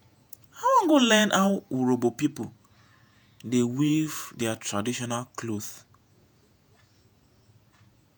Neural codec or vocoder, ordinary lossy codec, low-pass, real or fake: vocoder, 48 kHz, 128 mel bands, Vocos; none; none; fake